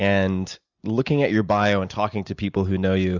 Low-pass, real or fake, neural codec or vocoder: 7.2 kHz; real; none